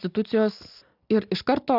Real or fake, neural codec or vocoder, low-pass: real; none; 5.4 kHz